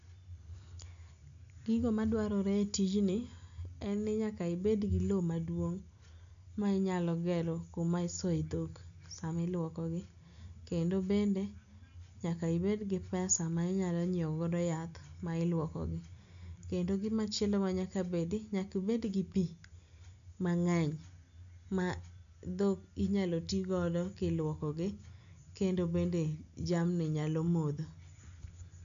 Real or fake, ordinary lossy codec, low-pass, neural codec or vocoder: real; MP3, 64 kbps; 7.2 kHz; none